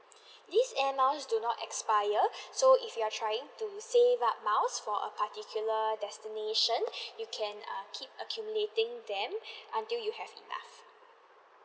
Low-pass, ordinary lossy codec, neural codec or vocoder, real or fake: none; none; none; real